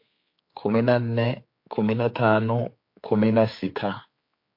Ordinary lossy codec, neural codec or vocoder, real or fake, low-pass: MP3, 32 kbps; codec, 16 kHz, 4 kbps, X-Codec, HuBERT features, trained on general audio; fake; 5.4 kHz